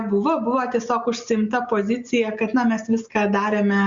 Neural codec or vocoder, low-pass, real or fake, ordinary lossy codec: none; 7.2 kHz; real; Opus, 64 kbps